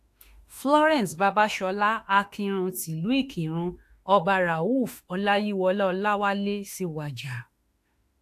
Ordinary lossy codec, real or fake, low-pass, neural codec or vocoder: AAC, 64 kbps; fake; 14.4 kHz; autoencoder, 48 kHz, 32 numbers a frame, DAC-VAE, trained on Japanese speech